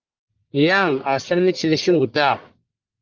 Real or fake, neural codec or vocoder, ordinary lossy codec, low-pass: fake; codec, 44.1 kHz, 1.7 kbps, Pupu-Codec; Opus, 32 kbps; 7.2 kHz